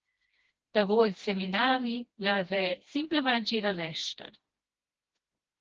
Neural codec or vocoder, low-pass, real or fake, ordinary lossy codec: codec, 16 kHz, 1 kbps, FreqCodec, smaller model; 7.2 kHz; fake; Opus, 16 kbps